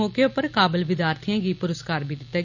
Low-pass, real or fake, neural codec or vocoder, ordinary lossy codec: 7.2 kHz; real; none; none